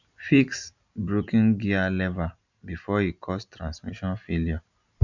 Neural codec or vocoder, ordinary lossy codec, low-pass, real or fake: none; none; 7.2 kHz; real